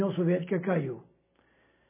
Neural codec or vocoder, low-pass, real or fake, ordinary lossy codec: none; 3.6 kHz; real; MP3, 16 kbps